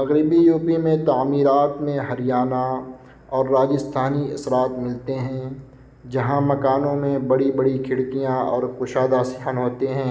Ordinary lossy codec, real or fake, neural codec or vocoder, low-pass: none; real; none; none